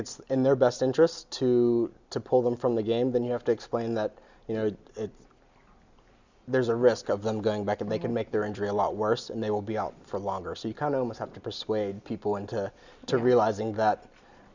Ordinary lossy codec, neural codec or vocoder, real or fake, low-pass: Opus, 64 kbps; none; real; 7.2 kHz